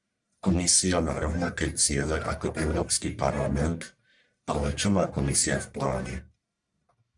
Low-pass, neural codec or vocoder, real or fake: 10.8 kHz; codec, 44.1 kHz, 1.7 kbps, Pupu-Codec; fake